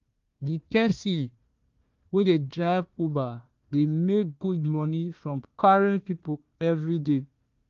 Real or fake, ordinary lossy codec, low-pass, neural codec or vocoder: fake; Opus, 32 kbps; 7.2 kHz; codec, 16 kHz, 1 kbps, FunCodec, trained on Chinese and English, 50 frames a second